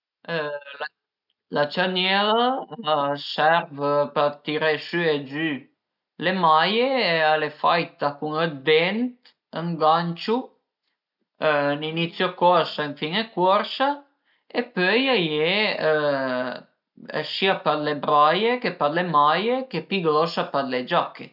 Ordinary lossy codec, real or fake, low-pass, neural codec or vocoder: none; real; 5.4 kHz; none